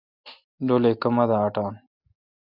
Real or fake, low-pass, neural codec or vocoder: real; 5.4 kHz; none